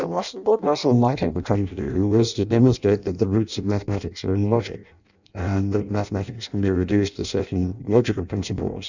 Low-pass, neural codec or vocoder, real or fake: 7.2 kHz; codec, 16 kHz in and 24 kHz out, 0.6 kbps, FireRedTTS-2 codec; fake